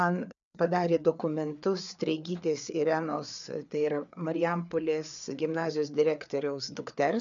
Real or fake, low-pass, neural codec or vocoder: fake; 7.2 kHz; codec, 16 kHz, 4 kbps, FreqCodec, larger model